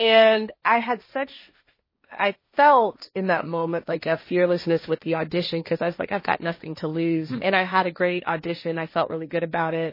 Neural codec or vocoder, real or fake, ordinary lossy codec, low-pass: codec, 16 kHz, 1.1 kbps, Voila-Tokenizer; fake; MP3, 24 kbps; 5.4 kHz